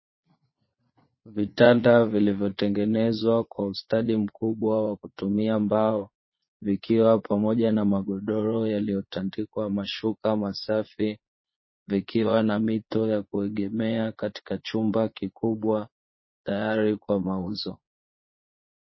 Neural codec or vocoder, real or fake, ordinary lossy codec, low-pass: vocoder, 22.05 kHz, 80 mel bands, Vocos; fake; MP3, 24 kbps; 7.2 kHz